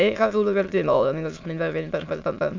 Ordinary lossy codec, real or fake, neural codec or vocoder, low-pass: MP3, 64 kbps; fake; autoencoder, 22.05 kHz, a latent of 192 numbers a frame, VITS, trained on many speakers; 7.2 kHz